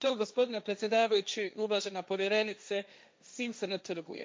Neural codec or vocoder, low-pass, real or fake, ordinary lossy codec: codec, 16 kHz, 1.1 kbps, Voila-Tokenizer; none; fake; none